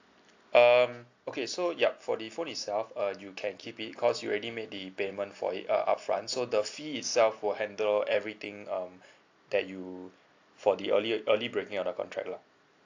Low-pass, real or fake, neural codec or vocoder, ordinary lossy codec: 7.2 kHz; real; none; AAC, 48 kbps